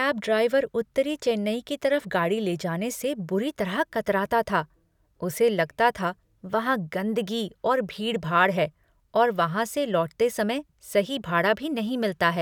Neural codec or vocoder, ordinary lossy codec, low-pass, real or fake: none; none; 19.8 kHz; real